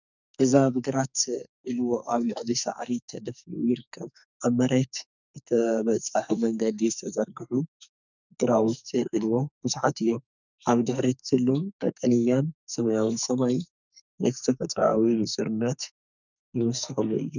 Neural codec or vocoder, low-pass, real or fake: codec, 44.1 kHz, 2.6 kbps, SNAC; 7.2 kHz; fake